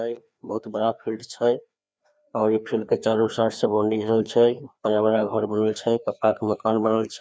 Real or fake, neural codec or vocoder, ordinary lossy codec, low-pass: fake; codec, 16 kHz, 2 kbps, FreqCodec, larger model; none; none